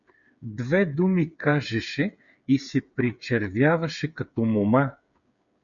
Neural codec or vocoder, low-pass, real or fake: codec, 16 kHz, 8 kbps, FreqCodec, smaller model; 7.2 kHz; fake